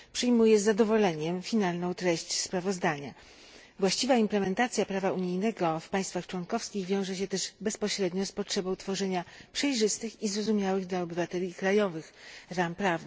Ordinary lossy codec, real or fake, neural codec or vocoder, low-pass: none; real; none; none